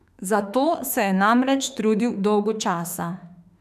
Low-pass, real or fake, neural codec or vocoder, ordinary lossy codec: 14.4 kHz; fake; autoencoder, 48 kHz, 32 numbers a frame, DAC-VAE, trained on Japanese speech; none